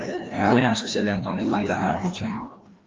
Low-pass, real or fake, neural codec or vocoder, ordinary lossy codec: 7.2 kHz; fake; codec, 16 kHz, 1 kbps, FreqCodec, larger model; Opus, 32 kbps